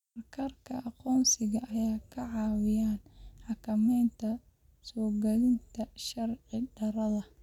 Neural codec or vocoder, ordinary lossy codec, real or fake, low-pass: none; none; real; 19.8 kHz